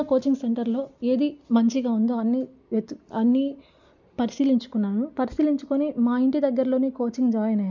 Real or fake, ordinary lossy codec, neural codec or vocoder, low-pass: real; none; none; 7.2 kHz